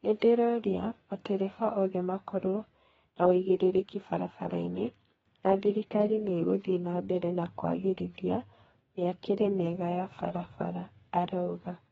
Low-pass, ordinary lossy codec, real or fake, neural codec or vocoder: 14.4 kHz; AAC, 24 kbps; fake; codec, 32 kHz, 1.9 kbps, SNAC